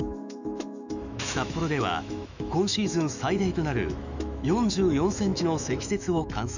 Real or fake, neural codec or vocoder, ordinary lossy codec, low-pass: fake; autoencoder, 48 kHz, 128 numbers a frame, DAC-VAE, trained on Japanese speech; none; 7.2 kHz